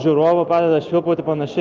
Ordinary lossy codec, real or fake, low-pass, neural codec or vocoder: Opus, 32 kbps; real; 7.2 kHz; none